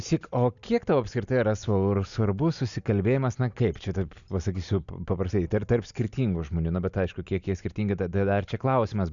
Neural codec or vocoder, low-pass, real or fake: none; 7.2 kHz; real